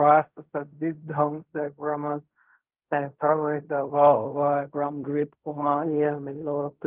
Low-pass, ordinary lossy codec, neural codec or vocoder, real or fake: 3.6 kHz; Opus, 32 kbps; codec, 16 kHz in and 24 kHz out, 0.4 kbps, LongCat-Audio-Codec, fine tuned four codebook decoder; fake